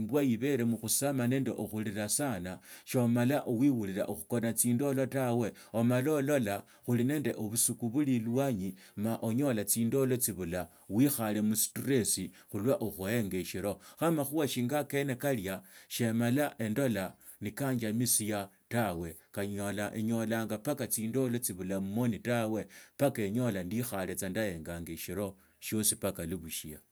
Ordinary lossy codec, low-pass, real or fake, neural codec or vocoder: none; none; real; none